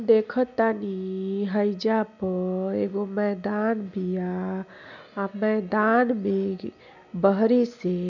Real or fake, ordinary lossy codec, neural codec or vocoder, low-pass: real; none; none; 7.2 kHz